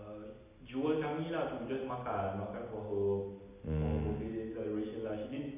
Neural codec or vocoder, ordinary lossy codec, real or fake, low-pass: none; none; real; 3.6 kHz